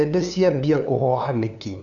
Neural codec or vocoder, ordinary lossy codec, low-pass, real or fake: codec, 16 kHz, 2 kbps, FunCodec, trained on LibriTTS, 25 frames a second; none; 7.2 kHz; fake